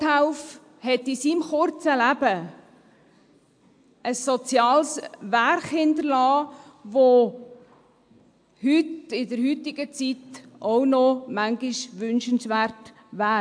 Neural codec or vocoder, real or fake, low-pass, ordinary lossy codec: none; real; 9.9 kHz; AAC, 64 kbps